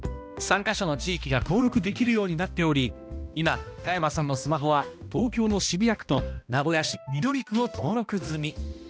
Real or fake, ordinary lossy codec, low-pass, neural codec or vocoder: fake; none; none; codec, 16 kHz, 1 kbps, X-Codec, HuBERT features, trained on balanced general audio